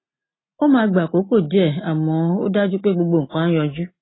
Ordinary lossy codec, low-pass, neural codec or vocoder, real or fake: AAC, 16 kbps; 7.2 kHz; none; real